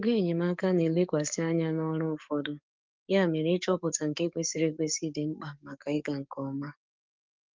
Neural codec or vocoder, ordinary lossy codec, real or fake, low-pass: codec, 44.1 kHz, 7.8 kbps, DAC; Opus, 24 kbps; fake; 7.2 kHz